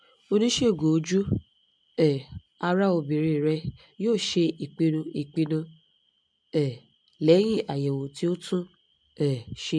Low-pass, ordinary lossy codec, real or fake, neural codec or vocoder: 9.9 kHz; MP3, 64 kbps; real; none